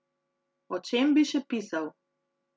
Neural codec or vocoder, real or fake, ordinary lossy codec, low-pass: none; real; none; none